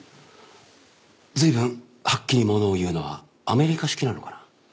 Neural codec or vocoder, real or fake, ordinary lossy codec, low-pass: none; real; none; none